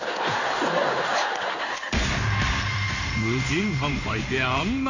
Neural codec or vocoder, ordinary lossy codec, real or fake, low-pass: codec, 16 kHz in and 24 kHz out, 1 kbps, XY-Tokenizer; none; fake; 7.2 kHz